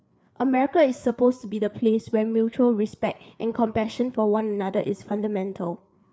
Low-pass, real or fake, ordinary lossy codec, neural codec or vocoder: none; fake; none; codec, 16 kHz, 4 kbps, FreqCodec, larger model